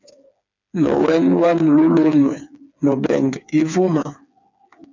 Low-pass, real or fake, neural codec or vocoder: 7.2 kHz; fake; codec, 16 kHz, 4 kbps, FreqCodec, smaller model